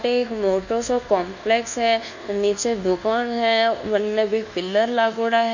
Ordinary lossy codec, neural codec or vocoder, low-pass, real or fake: none; codec, 24 kHz, 1.2 kbps, DualCodec; 7.2 kHz; fake